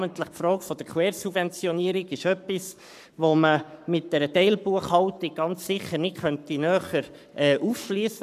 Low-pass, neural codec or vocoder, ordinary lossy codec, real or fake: 14.4 kHz; codec, 44.1 kHz, 7.8 kbps, Pupu-Codec; none; fake